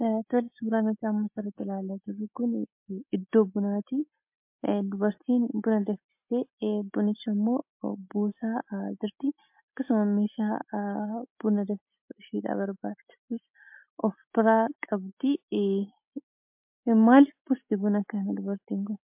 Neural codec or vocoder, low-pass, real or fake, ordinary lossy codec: none; 3.6 kHz; real; MP3, 32 kbps